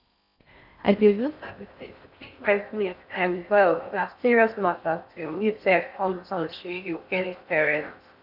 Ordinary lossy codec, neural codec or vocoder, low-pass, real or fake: none; codec, 16 kHz in and 24 kHz out, 0.6 kbps, FocalCodec, streaming, 4096 codes; 5.4 kHz; fake